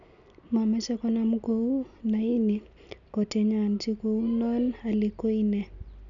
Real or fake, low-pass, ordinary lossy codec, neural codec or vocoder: real; 7.2 kHz; none; none